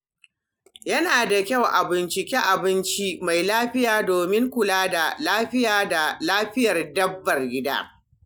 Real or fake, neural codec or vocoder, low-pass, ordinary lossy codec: real; none; none; none